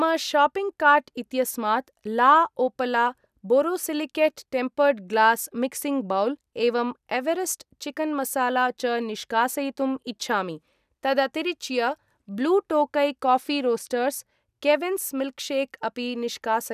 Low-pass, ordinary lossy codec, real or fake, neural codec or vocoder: 14.4 kHz; none; real; none